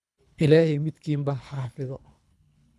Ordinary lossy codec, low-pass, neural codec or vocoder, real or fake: none; none; codec, 24 kHz, 3 kbps, HILCodec; fake